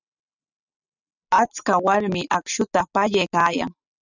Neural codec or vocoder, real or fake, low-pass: none; real; 7.2 kHz